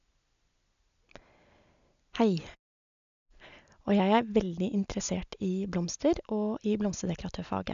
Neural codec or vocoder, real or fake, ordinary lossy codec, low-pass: none; real; AAC, 96 kbps; 7.2 kHz